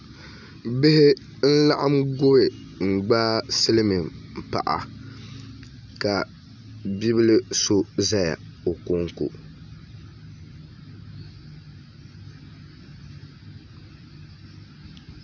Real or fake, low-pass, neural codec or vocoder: real; 7.2 kHz; none